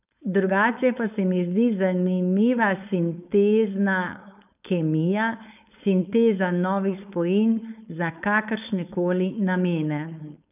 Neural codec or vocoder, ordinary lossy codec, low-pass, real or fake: codec, 16 kHz, 4.8 kbps, FACodec; none; 3.6 kHz; fake